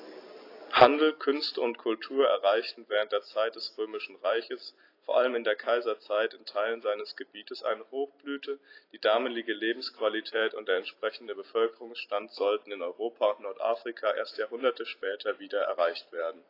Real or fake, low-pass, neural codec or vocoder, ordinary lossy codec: real; 5.4 kHz; none; AAC, 32 kbps